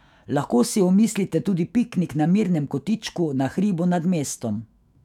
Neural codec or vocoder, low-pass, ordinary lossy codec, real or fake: autoencoder, 48 kHz, 128 numbers a frame, DAC-VAE, trained on Japanese speech; 19.8 kHz; none; fake